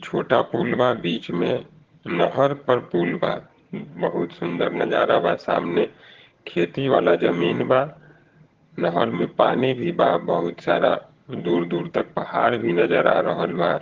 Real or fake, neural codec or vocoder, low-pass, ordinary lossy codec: fake; vocoder, 22.05 kHz, 80 mel bands, HiFi-GAN; 7.2 kHz; Opus, 16 kbps